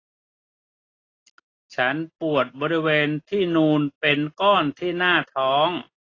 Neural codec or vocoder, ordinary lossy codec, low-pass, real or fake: none; AAC, 32 kbps; 7.2 kHz; real